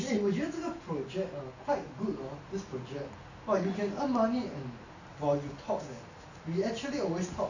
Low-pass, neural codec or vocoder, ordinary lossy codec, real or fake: 7.2 kHz; none; none; real